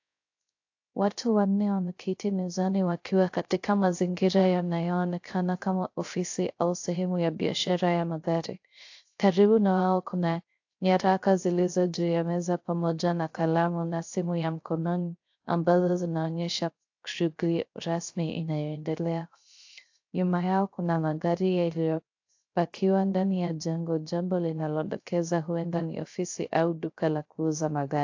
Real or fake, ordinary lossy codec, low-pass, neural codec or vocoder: fake; AAC, 48 kbps; 7.2 kHz; codec, 16 kHz, 0.3 kbps, FocalCodec